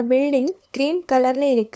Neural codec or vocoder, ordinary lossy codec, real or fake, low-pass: codec, 16 kHz, 2 kbps, FunCodec, trained on LibriTTS, 25 frames a second; none; fake; none